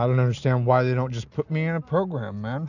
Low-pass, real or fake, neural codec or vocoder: 7.2 kHz; real; none